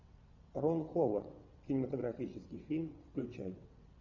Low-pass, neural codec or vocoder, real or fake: 7.2 kHz; vocoder, 22.05 kHz, 80 mel bands, WaveNeXt; fake